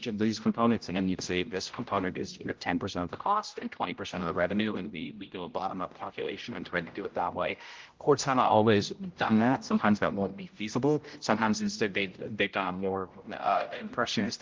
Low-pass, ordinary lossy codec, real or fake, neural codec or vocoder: 7.2 kHz; Opus, 32 kbps; fake; codec, 16 kHz, 0.5 kbps, X-Codec, HuBERT features, trained on general audio